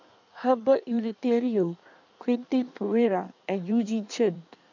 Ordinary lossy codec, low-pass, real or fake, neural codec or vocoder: none; 7.2 kHz; fake; codec, 16 kHz in and 24 kHz out, 1.1 kbps, FireRedTTS-2 codec